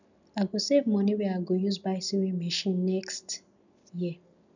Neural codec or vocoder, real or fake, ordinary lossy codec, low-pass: none; real; none; 7.2 kHz